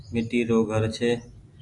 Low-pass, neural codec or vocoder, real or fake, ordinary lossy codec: 10.8 kHz; none; real; AAC, 48 kbps